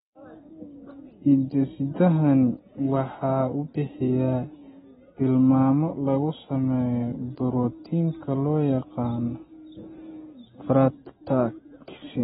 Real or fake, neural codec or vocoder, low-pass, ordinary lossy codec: real; none; 19.8 kHz; AAC, 16 kbps